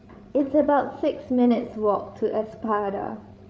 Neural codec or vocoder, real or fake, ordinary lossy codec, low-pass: codec, 16 kHz, 8 kbps, FreqCodec, larger model; fake; none; none